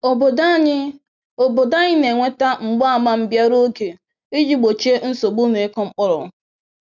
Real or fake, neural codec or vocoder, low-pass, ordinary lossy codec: real; none; 7.2 kHz; none